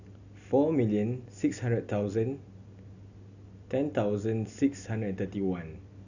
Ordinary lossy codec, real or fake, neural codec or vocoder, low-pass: none; real; none; 7.2 kHz